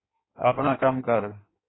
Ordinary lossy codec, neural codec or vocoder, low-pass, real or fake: AAC, 16 kbps; codec, 16 kHz in and 24 kHz out, 1.1 kbps, FireRedTTS-2 codec; 7.2 kHz; fake